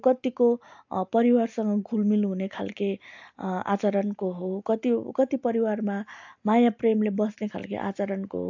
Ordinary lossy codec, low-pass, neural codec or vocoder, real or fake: AAC, 48 kbps; 7.2 kHz; none; real